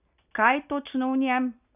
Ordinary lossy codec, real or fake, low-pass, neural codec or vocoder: none; real; 3.6 kHz; none